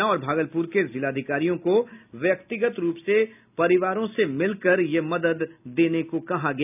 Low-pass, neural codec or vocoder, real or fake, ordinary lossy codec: 3.6 kHz; none; real; none